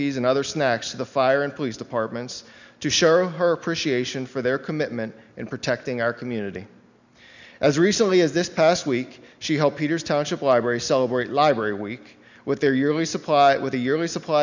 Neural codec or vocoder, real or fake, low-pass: none; real; 7.2 kHz